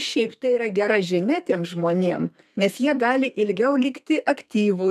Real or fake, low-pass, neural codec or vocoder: fake; 14.4 kHz; codec, 32 kHz, 1.9 kbps, SNAC